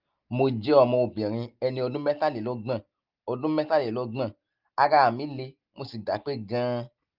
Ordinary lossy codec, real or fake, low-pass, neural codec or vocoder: Opus, 24 kbps; real; 5.4 kHz; none